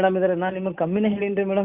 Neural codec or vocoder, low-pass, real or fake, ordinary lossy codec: none; 3.6 kHz; real; Opus, 64 kbps